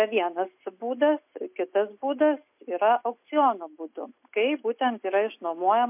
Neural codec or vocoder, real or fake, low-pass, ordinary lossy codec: none; real; 3.6 kHz; MP3, 32 kbps